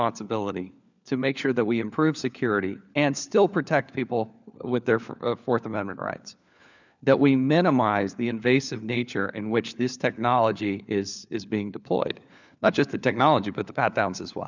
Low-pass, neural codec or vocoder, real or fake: 7.2 kHz; codec, 16 kHz, 4 kbps, FunCodec, trained on LibriTTS, 50 frames a second; fake